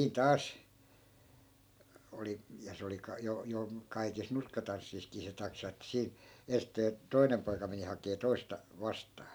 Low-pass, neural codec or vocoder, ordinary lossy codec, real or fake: none; none; none; real